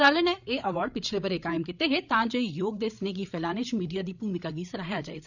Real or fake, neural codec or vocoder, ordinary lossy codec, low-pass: fake; codec, 16 kHz, 8 kbps, FreqCodec, larger model; none; 7.2 kHz